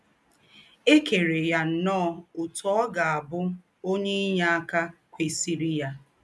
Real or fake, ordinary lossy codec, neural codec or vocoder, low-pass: real; none; none; none